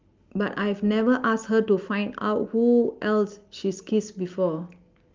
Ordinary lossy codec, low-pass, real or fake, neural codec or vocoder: Opus, 32 kbps; 7.2 kHz; real; none